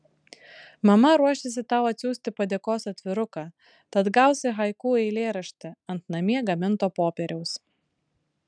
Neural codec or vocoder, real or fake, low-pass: none; real; 9.9 kHz